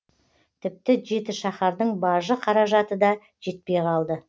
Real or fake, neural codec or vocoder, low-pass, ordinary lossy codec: real; none; none; none